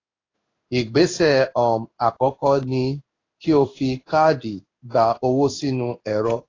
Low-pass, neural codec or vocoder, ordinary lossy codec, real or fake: 7.2 kHz; codec, 16 kHz in and 24 kHz out, 1 kbps, XY-Tokenizer; AAC, 32 kbps; fake